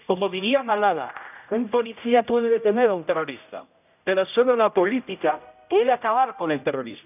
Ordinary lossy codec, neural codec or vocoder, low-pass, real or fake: none; codec, 16 kHz, 0.5 kbps, X-Codec, HuBERT features, trained on general audio; 3.6 kHz; fake